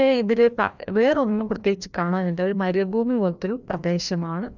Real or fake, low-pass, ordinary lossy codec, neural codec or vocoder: fake; 7.2 kHz; none; codec, 16 kHz, 1 kbps, FreqCodec, larger model